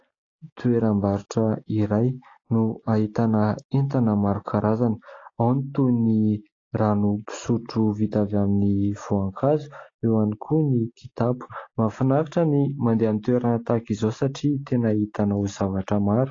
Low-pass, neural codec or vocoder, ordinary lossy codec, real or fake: 7.2 kHz; none; AAC, 32 kbps; real